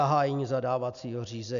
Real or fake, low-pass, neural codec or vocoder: real; 7.2 kHz; none